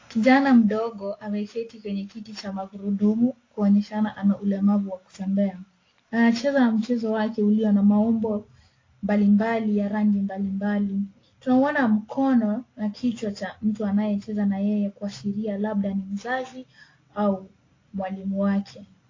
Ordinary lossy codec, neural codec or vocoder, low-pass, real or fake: AAC, 32 kbps; none; 7.2 kHz; real